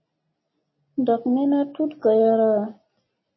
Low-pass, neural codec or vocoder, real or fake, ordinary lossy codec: 7.2 kHz; none; real; MP3, 24 kbps